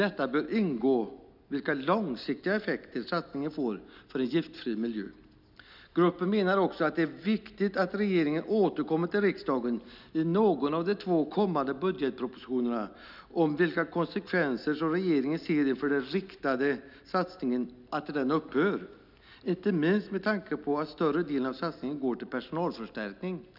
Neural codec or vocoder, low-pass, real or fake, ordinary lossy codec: none; 5.4 kHz; real; none